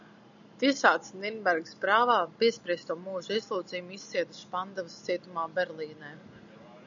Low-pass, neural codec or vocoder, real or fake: 7.2 kHz; none; real